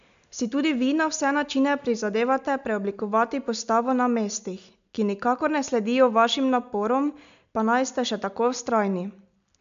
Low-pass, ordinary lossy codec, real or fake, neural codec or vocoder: 7.2 kHz; MP3, 64 kbps; real; none